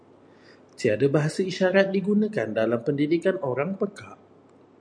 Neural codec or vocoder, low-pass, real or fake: none; 9.9 kHz; real